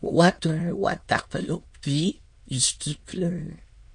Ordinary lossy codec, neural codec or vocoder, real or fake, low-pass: MP3, 48 kbps; autoencoder, 22.05 kHz, a latent of 192 numbers a frame, VITS, trained on many speakers; fake; 9.9 kHz